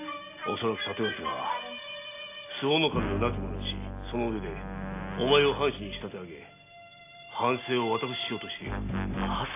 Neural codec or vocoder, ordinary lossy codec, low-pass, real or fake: none; AAC, 24 kbps; 3.6 kHz; real